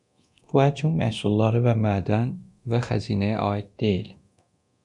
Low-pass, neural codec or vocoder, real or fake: 10.8 kHz; codec, 24 kHz, 0.9 kbps, DualCodec; fake